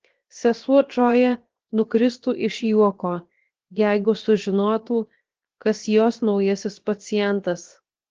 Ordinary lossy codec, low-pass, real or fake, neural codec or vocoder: Opus, 16 kbps; 7.2 kHz; fake; codec, 16 kHz, 0.7 kbps, FocalCodec